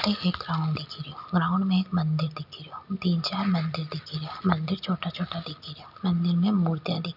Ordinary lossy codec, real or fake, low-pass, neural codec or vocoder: none; real; 5.4 kHz; none